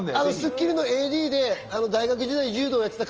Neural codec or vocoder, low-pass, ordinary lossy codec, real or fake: none; 7.2 kHz; Opus, 24 kbps; real